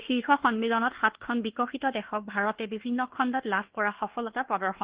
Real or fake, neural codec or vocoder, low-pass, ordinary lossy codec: fake; codec, 24 kHz, 1.2 kbps, DualCodec; 3.6 kHz; Opus, 16 kbps